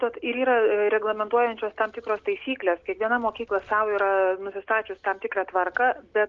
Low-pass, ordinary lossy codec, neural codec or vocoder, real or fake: 7.2 kHz; Opus, 32 kbps; none; real